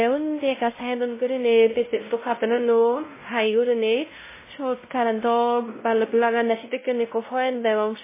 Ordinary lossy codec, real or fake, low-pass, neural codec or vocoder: MP3, 16 kbps; fake; 3.6 kHz; codec, 16 kHz, 0.5 kbps, X-Codec, WavLM features, trained on Multilingual LibriSpeech